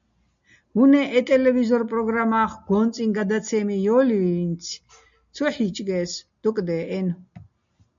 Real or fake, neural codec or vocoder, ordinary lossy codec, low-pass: real; none; MP3, 64 kbps; 7.2 kHz